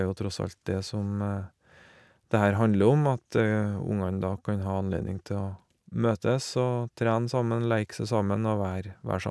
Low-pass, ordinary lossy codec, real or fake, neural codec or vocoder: none; none; real; none